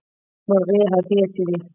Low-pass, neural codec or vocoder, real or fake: 3.6 kHz; none; real